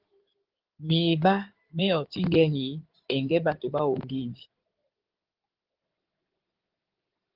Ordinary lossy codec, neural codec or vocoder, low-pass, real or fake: Opus, 16 kbps; codec, 16 kHz in and 24 kHz out, 2.2 kbps, FireRedTTS-2 codec; 5.4 kHz; fake